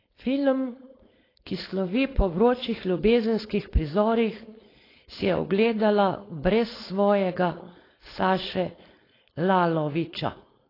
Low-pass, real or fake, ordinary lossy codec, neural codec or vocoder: 5.4 kHz; fake; AAC, 24 kbps; codec, 16 kHz, 4.8 kbps, FACodec